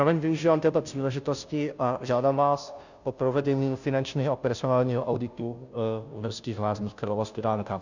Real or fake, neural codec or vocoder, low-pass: fake; codec, 16 kHz, 0.5 kbps, FunCodec, trained on Chinese and English, 25 frames a second; 7.2 kHz